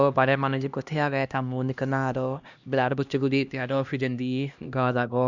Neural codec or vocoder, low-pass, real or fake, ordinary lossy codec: codec, 16 kHz, 1 kbps, X-Codec, HuBERT features, trained on LibriSpeech; 7.2 kHz; fake; Opus, 64 kbps